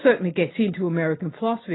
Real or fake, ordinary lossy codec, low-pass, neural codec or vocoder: real; AAC, 16 kbps; 7.2 kHz; none